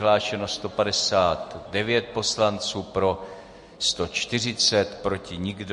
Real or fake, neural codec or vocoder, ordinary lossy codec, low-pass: real; none; MP3, 48 kbps; 14.4 kHz